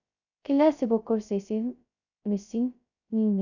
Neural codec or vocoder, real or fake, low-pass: codec, 16 kHz, 0.2 kbps, FocalCodec; fake; 7.2 kHz